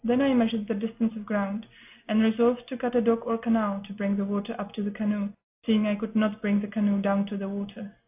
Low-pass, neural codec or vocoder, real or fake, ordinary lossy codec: 3.6 kHz; none; real; AAC, 32 kbps